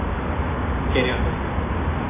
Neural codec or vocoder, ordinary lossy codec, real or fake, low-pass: none; AAC, 16 kbps; real; 3.6 kHz